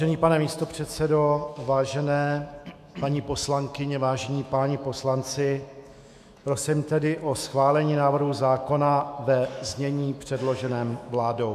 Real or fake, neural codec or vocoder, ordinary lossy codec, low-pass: fake; autoencoder, 48 kHz, 128 numbers a frame, DAC-VAE, trained on Japanese speech; AAC, 96 kbps; 14.4 kHz